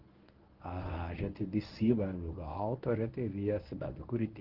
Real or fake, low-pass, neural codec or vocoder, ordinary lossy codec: fake; 5.4 kHz; codec, 24 kHz, 0.9 kbps, WavTokenizer, medium speech release version 1; Opus, 16 kbps